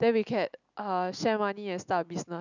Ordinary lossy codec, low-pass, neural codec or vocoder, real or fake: none; 7.2 kHz; none; real